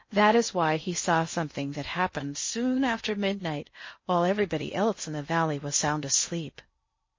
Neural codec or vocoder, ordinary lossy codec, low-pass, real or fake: codec, 16 kHz in and 24 kHz out, 0.6 kbps, FocalCodec, streaming, 2048 codes; MP3, 32 kbps; 7.2 kHz; fake